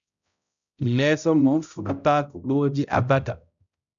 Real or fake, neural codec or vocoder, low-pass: fake; codec, 16 kHz, 0.5 kbps, X-Codec, HuBERT features, trained on balanced general audio; 7.2 kHz